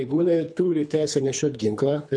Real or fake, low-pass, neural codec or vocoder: fake; 9.9 kHz; codec, 24 kHz, 3 kbps, HILCodec